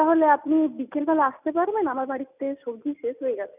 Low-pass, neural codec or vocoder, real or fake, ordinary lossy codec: 3.6 kHz; none; real; none